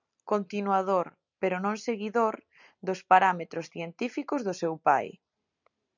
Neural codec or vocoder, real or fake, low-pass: none; real; 7.2 kHz